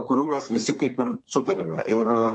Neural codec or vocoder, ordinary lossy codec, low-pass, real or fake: codec, 24 kHz, 1 kbps, SNAC; MP3, 48 kbps; 10.8 kHz; fake